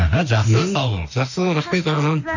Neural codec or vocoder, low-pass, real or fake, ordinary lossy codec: autoencoder, 48 kHz, 32 numbers a frame, DAC-VAE, trained on Japanese speech; 7.2 kHz; fake; none